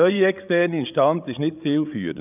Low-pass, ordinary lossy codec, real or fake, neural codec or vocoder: 3.6 kHz; none; fake; codec, 16 kHz, 8 kbps, FreqCodec, larger model